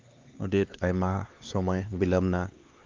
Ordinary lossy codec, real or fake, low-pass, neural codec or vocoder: Opus, 32 kbps; fake; 7.2 kHz; codec, 16 kHz, 2 kbps, X-Codec, HuBERT features, trained on LibriSpeech